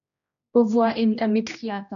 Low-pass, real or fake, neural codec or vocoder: 7.2 kHz; fake; codec, 16 kHz, 1 kbps, X-Codec, HuBERT features, trained on general audio